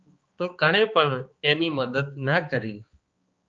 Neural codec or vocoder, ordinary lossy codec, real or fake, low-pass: codec, 16 kHz, 2 kbps, X-Codec, HuBERT features, trained on balanced general audio; Opus, 24 kbps; fake; 7.2 kHz